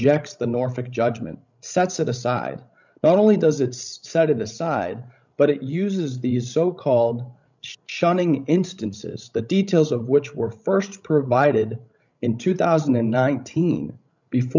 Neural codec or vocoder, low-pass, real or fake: codec, 16 kHz, 16 kbps, FreqCodec, larger model; 7.2 kHz; fake